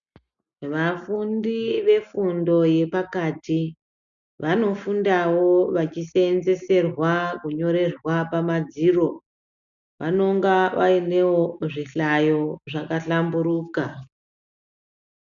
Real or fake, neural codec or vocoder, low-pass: real; none; 7.2 kHz